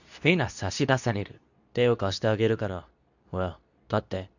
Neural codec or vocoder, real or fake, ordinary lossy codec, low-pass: codec, 24 kHz, 0.9 kbps, WavTokenizer, medium speech release version 2; fake; none; 7.2 kHz